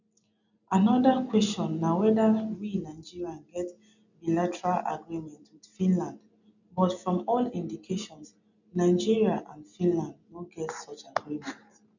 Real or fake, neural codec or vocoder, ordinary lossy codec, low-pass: real; none; none; 7.2 kHz